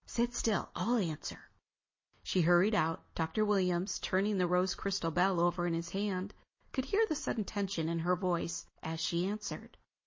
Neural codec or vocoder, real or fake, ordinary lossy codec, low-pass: none; real; MP3, 32 kbps; 7.2 kHz